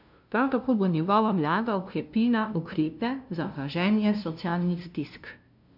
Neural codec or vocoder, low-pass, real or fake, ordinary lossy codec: codec, 16 kHz, 0.5 kbps, FunCodec, trained on LibriTTS, 25 frames a second; 5.4 kHz; fake; none